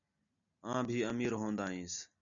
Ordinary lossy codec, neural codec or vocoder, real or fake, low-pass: MP3, 96 kbps; none; real; 7.2 kHz